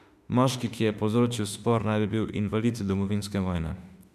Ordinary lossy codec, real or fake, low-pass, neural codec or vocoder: none; fake; 14.4 kHz; autoencoder, 48 kHz, 32 numbers a frame, DAC-VAE, trained on Japanese speech